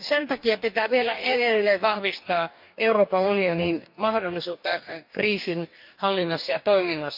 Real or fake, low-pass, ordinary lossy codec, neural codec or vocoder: fake; 5.4 kHz; MP3, 48 kbps; codec, 44.1 kHz, 2.6 kbps, DAC